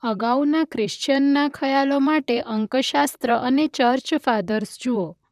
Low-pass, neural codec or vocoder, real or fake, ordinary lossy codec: 14.4 kHz; vocoder, 44.1 kHz, 128 mel bands, Pupu-Vocoder; fake; none